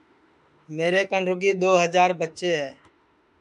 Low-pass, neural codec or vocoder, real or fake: 10.8 kHz; autoencoder, 48 kHz, 32 numbers a frame, DAC-VAE, trained on Japanese speech; fake